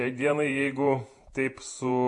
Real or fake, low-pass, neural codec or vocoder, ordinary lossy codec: fake; 10.8 kHz; vocoder, 48 kHz, 128 mel bands, Vocos; MP3, 48 kbps